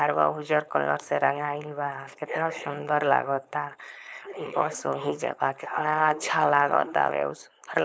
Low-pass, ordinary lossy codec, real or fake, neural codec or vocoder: none; none; fake; codec, 16 kHz, 4.8 kbps, FACodec